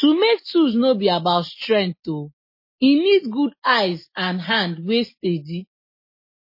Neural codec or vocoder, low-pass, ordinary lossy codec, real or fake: none; 5.4 kHz; MP3, 24 kbps; real